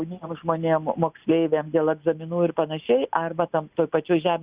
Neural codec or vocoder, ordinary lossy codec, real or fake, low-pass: none; Opus, 64 kbps; real; 3.6 kHz